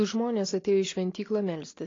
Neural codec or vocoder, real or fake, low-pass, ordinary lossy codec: none; real; 7.2 kHz; AAC, 32 kbps